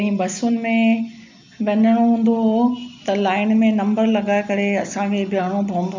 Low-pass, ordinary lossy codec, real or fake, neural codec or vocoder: 7.2 kHz; MP3, 64 kbps; real; none